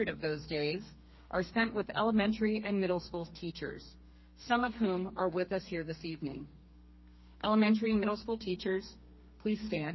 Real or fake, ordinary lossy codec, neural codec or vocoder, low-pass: fake; MP3, 24 kbps; codec, 32 kHz, 1.9 kbps, SNAC; 7.2 kHz